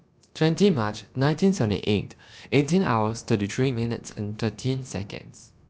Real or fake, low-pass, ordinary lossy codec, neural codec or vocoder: fake; none; none; codec, 16 kHz, about 1 kbps, DyCAST, with the encoder's durations